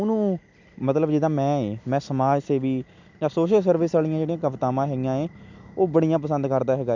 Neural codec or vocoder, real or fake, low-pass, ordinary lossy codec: none; real; 7.2 kHz; MP3, 64 kbps